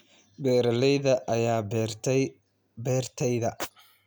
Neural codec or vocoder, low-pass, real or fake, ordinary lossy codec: none; none; real; none